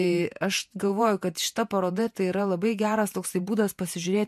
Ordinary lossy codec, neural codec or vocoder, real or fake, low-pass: MP3, 64 kbps; vocoder, 48 kHz, 128 mel bands, Vocos; fake; 14.4 kHz